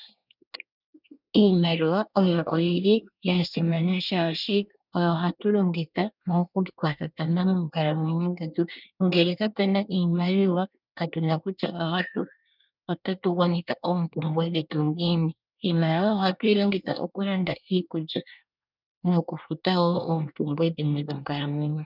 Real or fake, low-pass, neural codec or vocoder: fake; 5.4 kHz; codec, 24 kHz, 1 kbps, SNAC